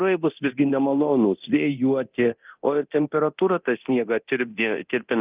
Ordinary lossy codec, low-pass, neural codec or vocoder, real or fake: Opus, 24 kbps; 3.6 kHz; codec, 24 kHz, 0.9 kbps, DualCodec; fake